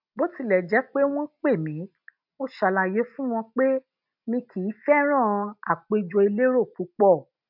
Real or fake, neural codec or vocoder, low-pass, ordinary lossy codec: real; none; 5.4 kHz; none